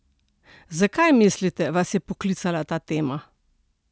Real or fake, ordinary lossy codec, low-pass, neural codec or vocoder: real; none; none; none